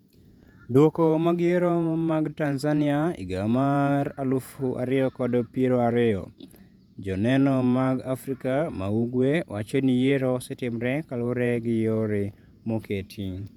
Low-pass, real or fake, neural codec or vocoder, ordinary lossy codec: 19.8 kHz; fake; vocoder, 48 kHz, 128 mel bands, Vocos; none